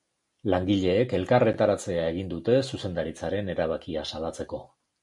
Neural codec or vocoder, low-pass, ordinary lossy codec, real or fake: none; 10.8 kHz; MP3, 96 kbps; real